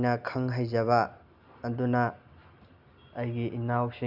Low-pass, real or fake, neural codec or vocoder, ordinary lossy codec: 5.4 kHz; real; none; none